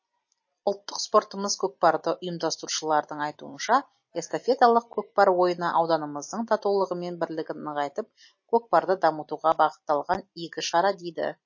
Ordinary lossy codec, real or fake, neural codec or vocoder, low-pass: MP3, 32 kbps; real; none; 7.2 kHz